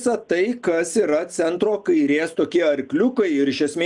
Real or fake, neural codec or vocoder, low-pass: real; none; 10.8 kHz